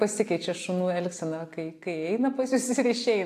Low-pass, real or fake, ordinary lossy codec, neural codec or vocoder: 14.4 kHz; real; AAC, 64 kbps; none